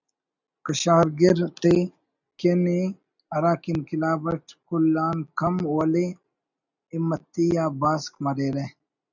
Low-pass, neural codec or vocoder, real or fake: 7.2 kHz; none; real